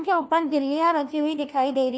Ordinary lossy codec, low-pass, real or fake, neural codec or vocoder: none; none; fake; codec, 16 kHz, 1 kbps, FunCodec, trained on LibriTTS, 50 frames a second